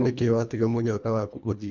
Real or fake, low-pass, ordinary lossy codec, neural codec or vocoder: fake; 7.2 kHz; none; codec, 24 kHz, 1.5 kbps, HILCodec